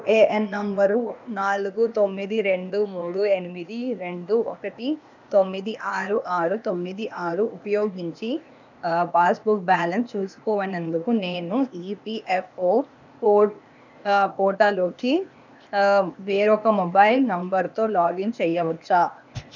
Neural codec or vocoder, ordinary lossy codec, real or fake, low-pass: codec, 16 kHz, 0.8 kbps, ZipCodec; none; fake; 7.2 kHz